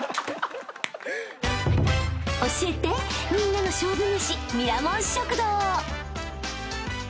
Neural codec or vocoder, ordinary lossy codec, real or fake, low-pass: none; none; real; none